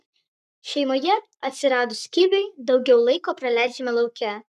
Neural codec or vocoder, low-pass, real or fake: codec, 44.1 kHz, 7.8 kbps, Pupu-Codec; 14.4 kHz; fake